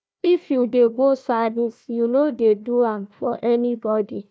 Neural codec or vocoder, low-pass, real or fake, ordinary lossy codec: codec, 16 kHz, 1 kbps, FunCodec, trained on Chinese and English, 50 frames a second; none; fake; none